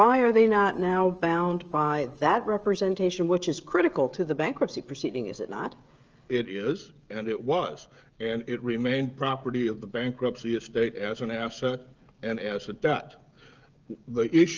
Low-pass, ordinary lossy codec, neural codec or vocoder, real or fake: 7.2 kHz; Opus, 24 kbps; codec, 16 kHz, 16 kbps, FreqCodec, smaller model; fake